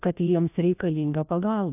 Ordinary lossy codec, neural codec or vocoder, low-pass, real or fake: AAC, 32 kbps; codec, 16 kHz, 1 kbps, FreqCodec, larger model; 3.6 kHz; fake